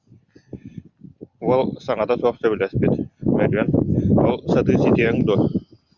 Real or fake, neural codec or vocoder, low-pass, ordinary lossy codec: real; none; 7.2 kHz; Opus, 64 kbps